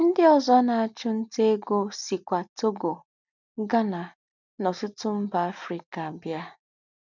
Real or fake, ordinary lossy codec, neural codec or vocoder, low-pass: real; none; none; 7.2 kHz